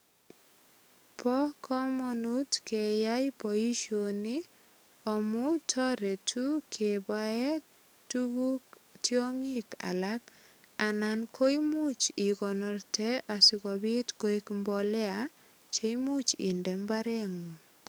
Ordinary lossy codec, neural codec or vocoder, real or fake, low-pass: none; codec, 44.1 kHz, 7.8 kbps, DAC; fake; none